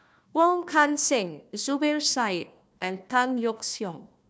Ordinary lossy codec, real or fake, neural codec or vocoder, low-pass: none; fake; codec, 16 kHz, 1 kbps, FunCodec, trained on Chinese and English, 50 frames a second; none